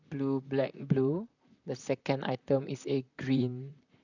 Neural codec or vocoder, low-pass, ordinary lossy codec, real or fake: vocoder, 44.1 kHz, 128 mel bands, Pupu-Vocoder; 7.2 kHz; none; fake